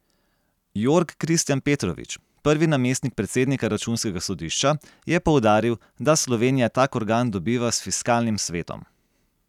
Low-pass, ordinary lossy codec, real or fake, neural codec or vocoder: 19.8 kHz; none; real; none